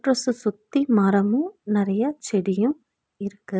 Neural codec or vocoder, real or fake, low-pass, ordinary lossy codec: none; real; none; none